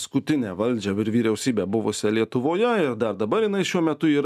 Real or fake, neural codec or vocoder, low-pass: real; none; 14.4 kHz